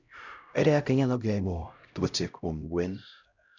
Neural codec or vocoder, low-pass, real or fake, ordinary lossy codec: codec, 16 kHz, 0.5 kbps, X-Codec, HuBERT features, trained on LibriSpeech; 7.2 kHz; fake; none